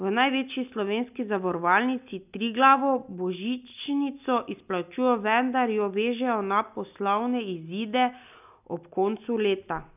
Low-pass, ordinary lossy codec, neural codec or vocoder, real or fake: 3.6 kHz; none; none; real